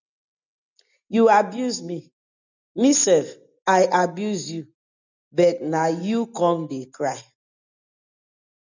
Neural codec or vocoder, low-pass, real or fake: none; 7.2 kHz; real